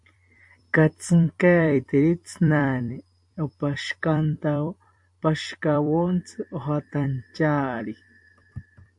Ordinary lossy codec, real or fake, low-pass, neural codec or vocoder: AAC, 64 kbps; fake; 10.8 kHz; vocoder, 44.1 kHz, 128 mel bands every 256 samples, BigVGAN v2